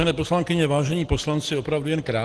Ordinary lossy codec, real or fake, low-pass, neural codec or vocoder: Opus, 16 kbps; real; 10.8 kHz; none